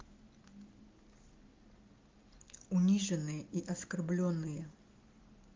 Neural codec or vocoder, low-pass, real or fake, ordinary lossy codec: none; 7.2 kHz; real; Opus, 32 kbps